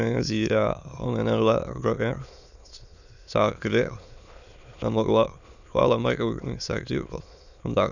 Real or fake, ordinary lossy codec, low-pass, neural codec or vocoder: fake; none; 7.2 kHz; autoencoder, 22.05 kHz, a latent of 192 numbers a frame, VITS, trained on many speakers